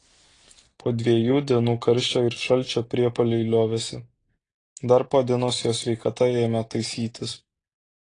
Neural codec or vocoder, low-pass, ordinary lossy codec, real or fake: none; 9.9 kHz; AAC, 32 kbps; real